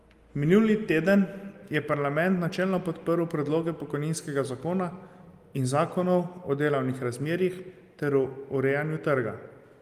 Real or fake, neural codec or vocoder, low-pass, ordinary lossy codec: real; none; 14.4 kHz; Opus, 32 kbps